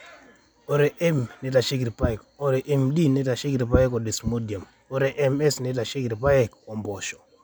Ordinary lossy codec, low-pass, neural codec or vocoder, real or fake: none; none; none; real